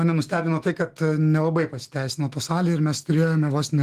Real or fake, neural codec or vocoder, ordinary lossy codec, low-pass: fake; autoencoder, 48 kHz, 128 numbers a frame, DAC-VAE, trained on Japanese speech; Opus, 24 kbps; 14.4 kHz